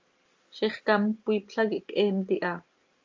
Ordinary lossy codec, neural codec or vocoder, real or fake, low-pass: Opus, 32 kbps; none; real; 7.2 kHz